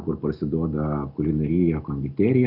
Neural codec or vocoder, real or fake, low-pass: none; real; 5.4 kHz